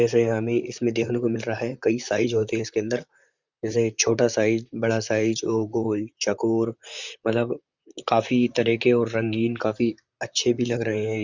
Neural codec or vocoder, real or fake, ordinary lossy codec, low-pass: vocoder, 44.1 kHz, 128 mel bands, Pupu-Vocoder; fake; Opus, 64 kbps; 7.2 kHz